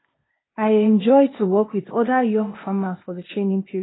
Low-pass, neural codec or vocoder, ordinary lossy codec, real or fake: 7.2 kHz; codec, 16 kHz, 0.8 kbps, ZipCodec; AAC, 16 kbps; fake